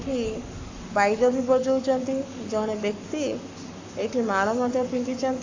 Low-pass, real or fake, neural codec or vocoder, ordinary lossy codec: 7.2 kHz; fake; codec, 44.1 kHz, 7.8 kbps, Pupu-Codec; AAC, 48 kbps